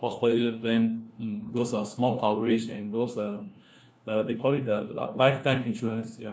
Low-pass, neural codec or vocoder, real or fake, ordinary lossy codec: none; codec, 16 kHz, 1 kbps, FunCodec, trained on LibriTTS, 50 frames a second; fake; none